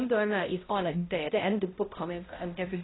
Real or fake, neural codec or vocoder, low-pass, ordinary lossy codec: fake; codec, 16 kHz, 0.5 kbps, X-Codec, HuBERT features, trained on balanced general audio; 7.2 kHz; AAC, 16 kbps